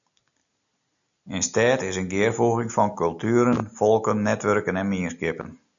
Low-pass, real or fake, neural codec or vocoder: 7.2 kHz; real; none